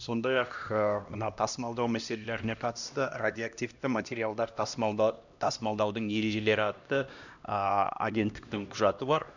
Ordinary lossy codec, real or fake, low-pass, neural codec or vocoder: none; fake; 7.2 kHz; codec, 16 kHz, 1 kbps, X-Codec, HuBERT features, trained on LibriSpeech